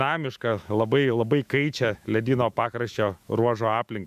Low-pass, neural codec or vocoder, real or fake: 14.4 kHz; autoencoder, 48 kHz, 128 numbers a frame, DAC-VAE, trained on Japanese speech; fake